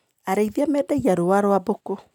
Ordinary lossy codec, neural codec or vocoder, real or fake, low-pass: none; none; real; 19.8 kHz